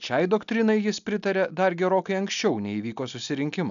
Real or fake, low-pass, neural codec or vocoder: real; 7.2 kHz; none